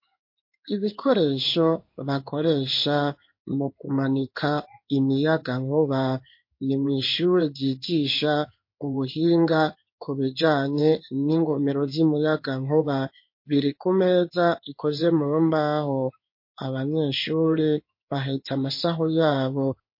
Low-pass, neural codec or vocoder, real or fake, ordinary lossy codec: 5.4 kHz; codec, 16 kHz in and 24 kHz out, 1 kbps, XY-Tokenizer; fake; MP3, 32 kbps